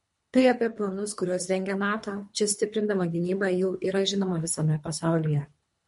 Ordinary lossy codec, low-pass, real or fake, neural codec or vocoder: MP3, 48 kbps; 10.8 kHz; fake; codec, 24 kHz, 3 kbps, HILCodec